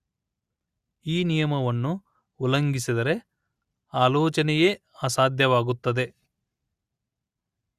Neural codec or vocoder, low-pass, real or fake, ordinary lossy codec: none; 14.4 kHz; real; Opus, 64 kbps